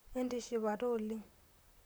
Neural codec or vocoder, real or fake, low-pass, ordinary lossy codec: vocoder, 44.1 kHz, 128 mel bands, Pupu-Vocoder; fake; none; none